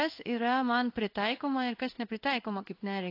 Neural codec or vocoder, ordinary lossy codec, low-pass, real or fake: codec, 16 kHz in and 24 kHz out, 1 kbps, XY-Tokenizer; AAC, 32 kbps; 5.4 kHz; fake